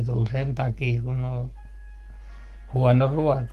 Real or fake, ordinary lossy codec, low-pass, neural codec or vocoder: fake; Opus, 24 kbps; 14.4 kHz; codec, 44.1 kHz, 7.8 kbps, Pupu-Codec